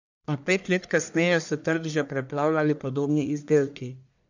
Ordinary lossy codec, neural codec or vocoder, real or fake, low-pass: none; codec, 44.1 kHz, 1.7 kbps, Pupu-Codec; fake; 7.2 kHz